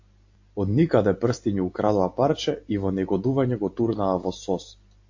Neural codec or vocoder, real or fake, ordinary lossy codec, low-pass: none; real; AAC, 48 kbps; 7.2 kHz